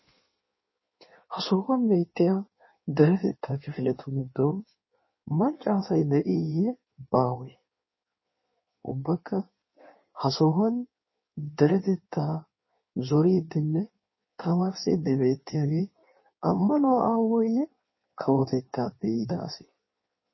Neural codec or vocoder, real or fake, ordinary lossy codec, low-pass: codec, 16 kHz in and 24 kHz out, 1.1 kbps, FireRedTTS-2 codec; fake; MP3, 24 kbps; 7.2 kHz